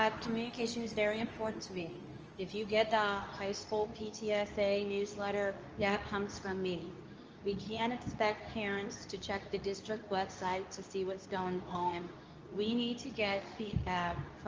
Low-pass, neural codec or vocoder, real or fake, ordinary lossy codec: 7.2 kHz; codec, 24 kHz, 0.9 kbps, WavTokenizer, medium speech release version 2; fake; Opus, 24 kbps